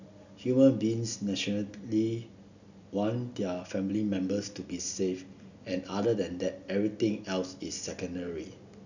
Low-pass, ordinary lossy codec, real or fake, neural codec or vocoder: 7.2 kHz; none; real; none